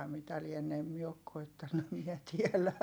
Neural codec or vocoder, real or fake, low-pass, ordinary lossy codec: none; real; none; none